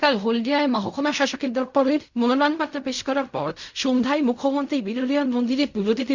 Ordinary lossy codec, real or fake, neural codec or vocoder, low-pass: Opus, 64 kbps; fake; codec, 16 kHz in and 24 kHz out, 0.4 kbps, LongCat-Audio-Codec, fine tuned four codebook decoder; 7.2 kHz